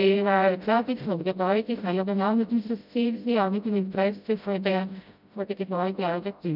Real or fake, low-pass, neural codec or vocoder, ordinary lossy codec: fake; 5.4 kHz; codec, 16 kHz, 0.5 kbps, FreqCodec, smaller model; none